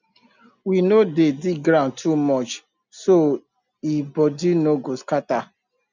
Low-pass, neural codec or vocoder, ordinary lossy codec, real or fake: 7.2 kHz; none; none; real